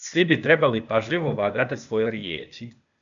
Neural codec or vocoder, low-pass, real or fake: codec, 16 kHz, 0.8 kbps, ZipCodec; 7.2 kHz; fake